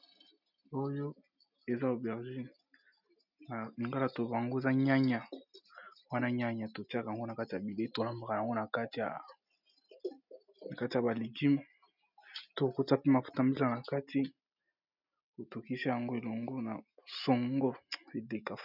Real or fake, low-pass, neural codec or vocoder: real; 5.4 kHz; none